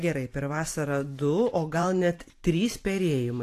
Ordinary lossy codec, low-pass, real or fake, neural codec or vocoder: AAC, 64 kbps; 14.4 kHz; fake; vocoder, 44.1 kHz, 128 mel bands every 512 samples, BigVGAN v2